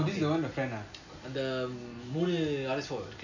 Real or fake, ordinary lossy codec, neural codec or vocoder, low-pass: real; none; none; 7.2 kHz